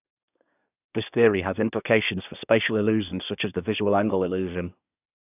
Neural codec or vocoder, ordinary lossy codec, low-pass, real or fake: codec, 24 kHz, 1 kbps, SNAC; none; 3.6 kHz; fake